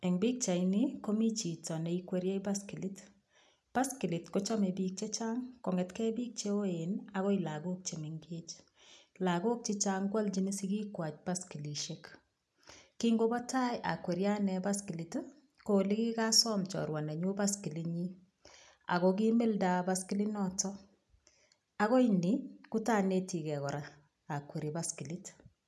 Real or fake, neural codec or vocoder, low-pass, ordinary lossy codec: real; none; none; none